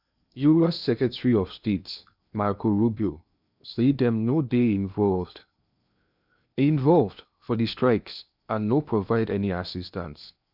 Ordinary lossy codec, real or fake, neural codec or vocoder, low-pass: none; fake; codec, 16 kHz in and 24 kHz out, 0.8 kbps, FocalCodec, streaming, 65536 codes; 5.4 kHz